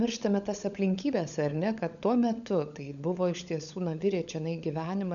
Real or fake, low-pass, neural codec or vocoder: fake; 7.2 kHz; codec, 16 kHz, 16 kbps, FunCodec, trained on LibriTTS, 50 frames a second